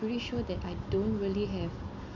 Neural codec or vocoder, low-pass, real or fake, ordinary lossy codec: none; 7.2 kHz; real; none